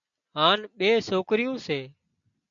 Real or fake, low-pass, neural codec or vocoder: real; 7.2 kHz; none